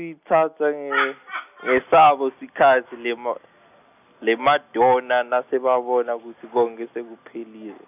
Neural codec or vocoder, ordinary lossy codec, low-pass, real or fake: none; none; 3.6 kHz; real